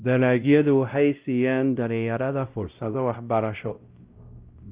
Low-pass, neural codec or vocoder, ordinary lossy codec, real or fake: 3.6 kHz; codec, 16 kHz, 0.5 kbps, X-Codec, WavLM features, trained on Multilingual LibriSpeech; Opus, 32 kbps; fake